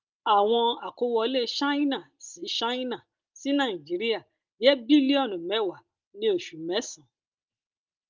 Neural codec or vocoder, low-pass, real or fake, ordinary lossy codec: none; 7.2 kHz; real; Opus, 24 kbps